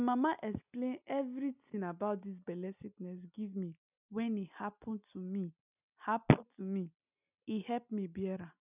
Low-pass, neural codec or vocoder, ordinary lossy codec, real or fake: 3.6 kHz; none; none; real